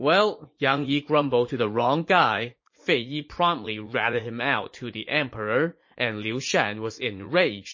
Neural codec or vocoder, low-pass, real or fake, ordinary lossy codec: vocoder, 44.1 kHz, 80 mel bands, Vocos; 7.2 kHz; fake; MP3, 32 kbps